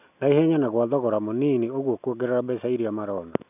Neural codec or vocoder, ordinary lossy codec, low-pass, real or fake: none; none; 3.6 kHz; real